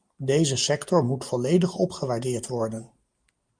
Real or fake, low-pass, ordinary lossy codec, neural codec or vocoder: real; 9.9 kHz; Opus, 32 kbps; none